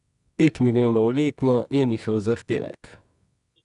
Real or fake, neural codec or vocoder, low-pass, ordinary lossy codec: fake; codec, 24 kHz, 0.9 kbps, WavTokenizer, medium music audio release; 10.8 kHz; none